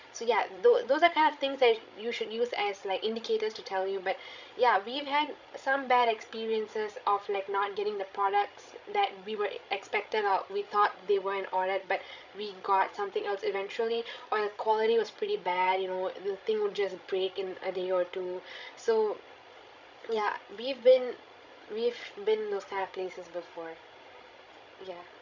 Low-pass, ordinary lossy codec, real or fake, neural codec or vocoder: 7.2 kHz; none; fake; codec, 16 kHz, 16 kbps, FreqCodec, larger model